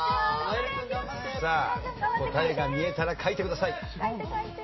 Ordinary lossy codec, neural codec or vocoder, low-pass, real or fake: MP3, 24 kbps; none; 7.2 kHz; real